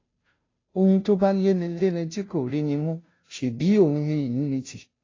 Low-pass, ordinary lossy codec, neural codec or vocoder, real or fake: 7.2 kHz; AAC, 32 kbps; codec, 16 kHz, 0.5 kbps, FunCodec, trained on Chinese and English, 25 frames a second; fake